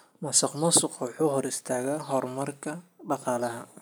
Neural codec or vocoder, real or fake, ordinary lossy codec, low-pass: none; real; none; none